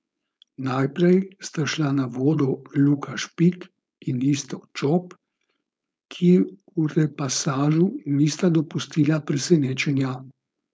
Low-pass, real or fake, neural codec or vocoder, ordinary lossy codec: none; fake; codec, 16 kHz, 4.8 kbps, FACodec; none